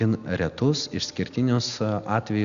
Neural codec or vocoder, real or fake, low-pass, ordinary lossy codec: none; real; 7.2 kHz; Opus, 64 kbps